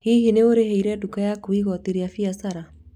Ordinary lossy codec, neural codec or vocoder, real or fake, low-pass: none; none; real; 19.8 kHz